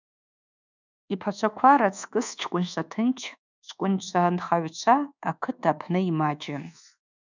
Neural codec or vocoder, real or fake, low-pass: codec, 24 kHz, 1.2 kbps, DualCodec; fake; 7.2 kHz